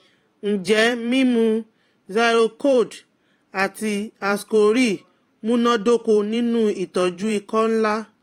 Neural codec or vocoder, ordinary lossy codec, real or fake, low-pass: vocoder, 44.1 kHz, 128 mel bands every 512 samples, BigVGAN v2; AAC, 48 kbps; fake; 19.8 kHz